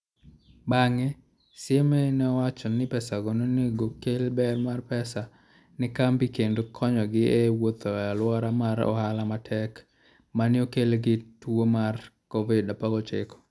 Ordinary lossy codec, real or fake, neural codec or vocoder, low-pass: none; real; none; none